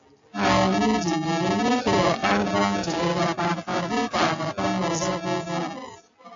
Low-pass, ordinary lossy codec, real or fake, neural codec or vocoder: 7.2 kHz; AAC, 64 kbps; real; none